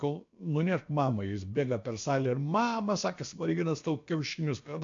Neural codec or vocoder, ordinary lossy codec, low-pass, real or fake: codec, 16 kHz, about 1 kbps, DyCAST, with the encoder's durations; MP3, 48 kbps; 7.2 kHz; fake